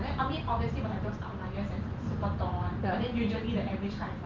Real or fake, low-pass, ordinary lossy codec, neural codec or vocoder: fake; 7.2 kHz; Opus, 24 kbps; vocoder, 44.1 kHz, 128 mel bands every 512 samples, BigVGAN v2